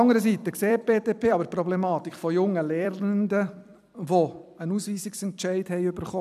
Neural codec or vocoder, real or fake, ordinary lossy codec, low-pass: vocoder, 44.1 kHz, 128 mel bands every 256 samples, BigVGAN v2; fake; none; 14.4 kHz